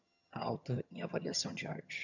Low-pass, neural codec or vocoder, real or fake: 7.2 kHz; vocoder, 22.05 kHz, 80 mel bands, HiFi-GAN; fake